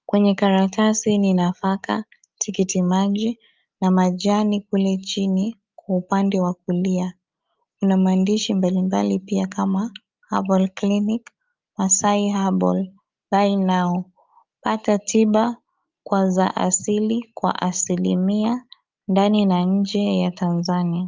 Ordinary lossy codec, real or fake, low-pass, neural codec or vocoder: Opus, 24 kbps; real; 7.2 kHz; none